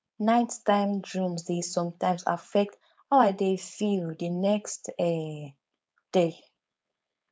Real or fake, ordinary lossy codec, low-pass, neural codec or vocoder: fake; none; none; codec, 16 kHz, 4.8 kbps, FACodec